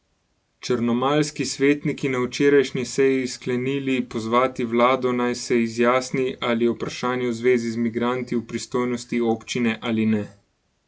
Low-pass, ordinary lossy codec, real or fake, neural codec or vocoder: none; none; real; none